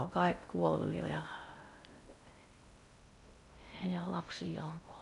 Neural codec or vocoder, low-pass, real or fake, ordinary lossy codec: codec, 16 kHz in and 24 kHz out, 0.6 kbps, FocalCodec, streaming, 2048 codes; 10.8 kHz; fake; none